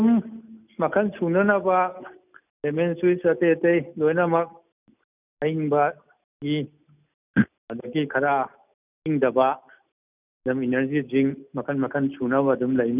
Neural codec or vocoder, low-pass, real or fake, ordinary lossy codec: none; 3.6 kHz; real; none